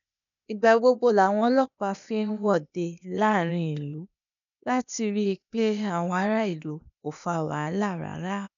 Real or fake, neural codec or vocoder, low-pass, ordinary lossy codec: fake; codec, 16 kHz, 0.8 kbps, ZipCodec; 7.2 kHz; none